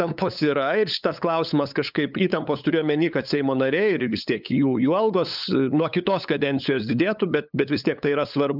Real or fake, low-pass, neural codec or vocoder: fake; 5.4 kHz; codec, 16 kHz, 8 kbps, FunCodec, trained on LibriTTS, 25 frames a second